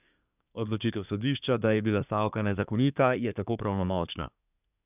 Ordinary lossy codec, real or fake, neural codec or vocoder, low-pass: none; fake; codec, 24 kHz, 1 kbps, SNAC; 3.6 kHz